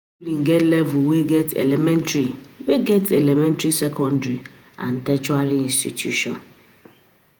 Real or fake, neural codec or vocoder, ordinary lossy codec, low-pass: fake; vocoder, 48 kHz, 128 mel bands, Vocos; none; none